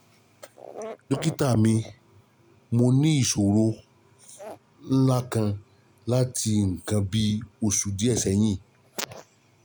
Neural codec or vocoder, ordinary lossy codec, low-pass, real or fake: none; none; none; real